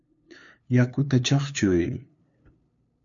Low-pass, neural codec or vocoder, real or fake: 7.2 kHz; codec, 16 kHz, 2 kbps, FunCodec, trained on LibriTTS, 25 frames a second; fake